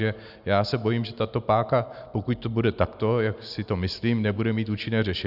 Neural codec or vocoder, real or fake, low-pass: none; real; 5.4 kHz